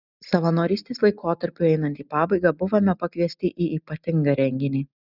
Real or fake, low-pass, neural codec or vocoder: real; 5.4 kHz; none